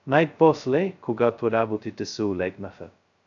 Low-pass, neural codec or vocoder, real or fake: 7.2 kHz; codec, 16 kHz, 0.2 kbps, FocalCodec; fake